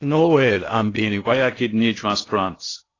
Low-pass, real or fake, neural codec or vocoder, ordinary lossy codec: 7.2 kHz; fake; codec, 16 kHz in and 24 kHz out, 0.6 kbps, FocalCodec, streaming, 2048 codes; AAC, 32 kbps